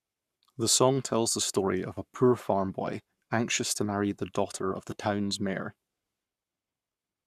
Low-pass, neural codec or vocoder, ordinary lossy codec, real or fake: 14.4 kHz; codec, 44.1 kHz, 7.8 kbps, Pupu-Codec; none; fake